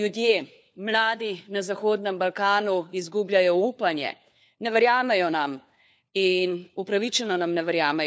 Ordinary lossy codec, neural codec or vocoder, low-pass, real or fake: none; codec, 16 kHz, 4 kbps, FunCodec, trained on LibriTTS, 50 frames a second; none; fake